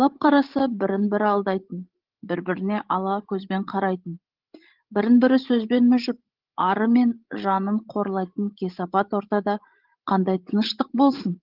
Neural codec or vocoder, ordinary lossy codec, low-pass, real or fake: codec, 16 kHz, 16 kbps, FreqCodec, larger model; Opus, 16 kbps; 5.4 kHz; fake